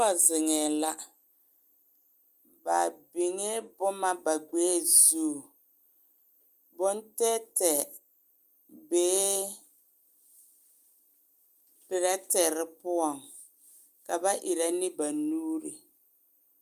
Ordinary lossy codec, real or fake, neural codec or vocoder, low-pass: Opus, 32 kbps; real; none; 14.4 kHz